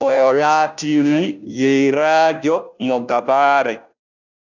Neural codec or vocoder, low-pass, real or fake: codec, 16 kHz, 0.5 kbps, FunCodec, trained on Chinese and English, 25 frames a second; 7.2 kHz; fake